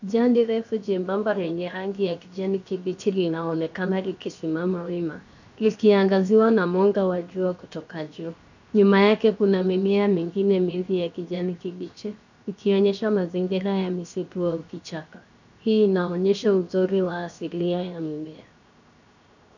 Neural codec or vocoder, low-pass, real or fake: codec, 16 kHz, 0.7 kbps, FocalCodec; 7.2 kHz; fake